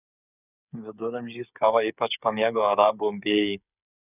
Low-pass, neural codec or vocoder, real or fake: 3.6 kHz; codec, 16 kHz, 8 kbps, FreqCodec, smaller model; fake